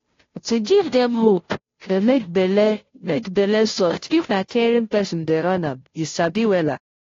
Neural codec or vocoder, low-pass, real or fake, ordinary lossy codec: codec, 16 kHz, 0.5 kbps, FunCodec, trained on Chinese and English, 25 frames a second; 7.2 kHz; fake; AAC, 32 kbps